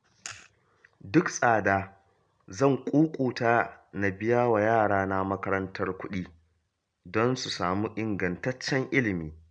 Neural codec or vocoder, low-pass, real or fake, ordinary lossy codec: none; 9.9 kHz; real; none